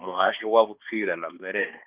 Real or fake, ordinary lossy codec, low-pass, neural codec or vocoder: fake; Opus, 24 kbps; 3.6 kHz; codec, 16 kHz, 2 kbps, X-Codec, HuBERT features, trained on balanced general audio